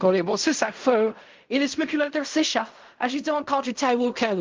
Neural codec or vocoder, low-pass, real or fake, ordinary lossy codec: codec, 16 kHz in and 24 kHz out, 0.4 kbps, LongCat-Audio-Codec, fine tuned four codebook decoder; 7.2 kHz; fake; Opus, 32 kbps